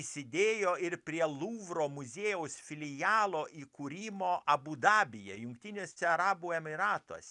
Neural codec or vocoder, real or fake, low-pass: none; real; 10.8 kHz